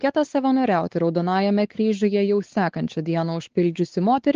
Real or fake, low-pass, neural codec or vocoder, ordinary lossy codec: fake; 7.2 kHz; codec, 16 kHz, 4 kbps, X-Codec, WavLM features, trained on Multilingual LibriSpeech; Opus, 16 kbps